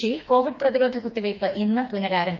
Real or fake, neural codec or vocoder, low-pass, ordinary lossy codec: fake; codec, 16 kHz, 2 kbps, FreqCodec, smaller model; 7.2 kHz; none